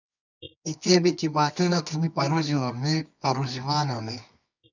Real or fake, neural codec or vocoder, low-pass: fake; codec, 24 kHz, 0.9 kbps, WavTokenizer, medium music audio release; 7.2 kHz